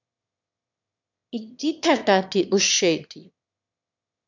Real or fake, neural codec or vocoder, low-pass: fake; autoencoder, 22.05 kHz, a latent of 192 numbers a frame, VITS, trained on one speaker; 7.2 kHz